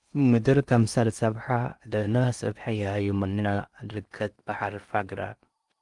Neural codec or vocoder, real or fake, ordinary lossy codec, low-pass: codec, 16 kHz in and 24 kHz out, 0.6 kbps, FocalCodec, streaming, 2048 codes; fake; Opus, 32 kbps; 10.8 kHz